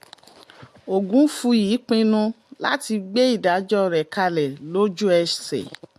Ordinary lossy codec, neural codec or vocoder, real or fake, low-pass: MP3, 64 kbps; none; real; 14.4 kHz